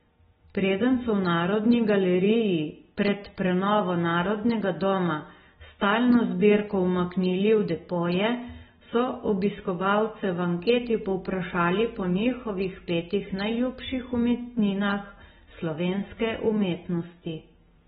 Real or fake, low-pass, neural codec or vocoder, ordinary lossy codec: real; 19.8 kHz; none; AAC, 16 kbps